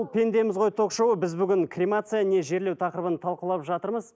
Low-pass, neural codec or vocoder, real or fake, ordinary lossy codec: none; none; real; none